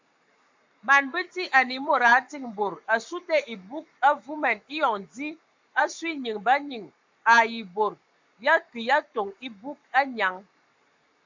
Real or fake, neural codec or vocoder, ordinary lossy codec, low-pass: fake; codec, 44.1 kHz, 7.8 kbps, Pupu-Codec; MP3, 64 kbps; 7.2 kHz